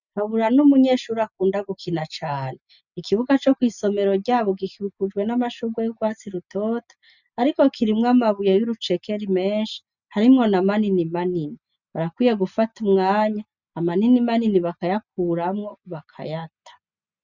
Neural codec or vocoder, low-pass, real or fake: none; 7.2 kHz; real